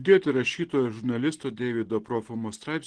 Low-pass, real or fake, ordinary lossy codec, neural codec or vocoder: 9.9 kHz; real; Opus, 16 kbps; none